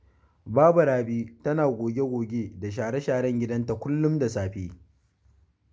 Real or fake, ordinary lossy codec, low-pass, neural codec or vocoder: real; none; none; none